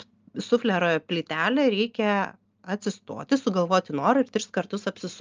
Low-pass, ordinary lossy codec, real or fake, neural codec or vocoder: 7.2 kHz; Opus, 24 kbps; real; none